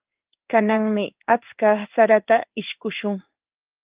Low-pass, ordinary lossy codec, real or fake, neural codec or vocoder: 3.6 kHz; Opus, 32 kbps; fake; codec, 16 kHz in and 24 kHz out, 1 kbps, XY-Tokenizer